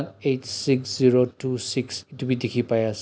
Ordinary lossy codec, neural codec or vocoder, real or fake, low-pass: none; none; real; none